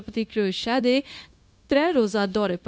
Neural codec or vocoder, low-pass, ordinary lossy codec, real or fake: codec, 16 kHz, 0.9 kbps, LongCat-Audio-Codec; none; none; fake